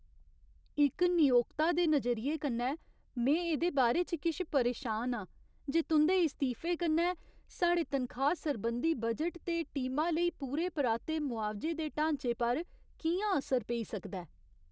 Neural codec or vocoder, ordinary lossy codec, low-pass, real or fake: none; none; none; real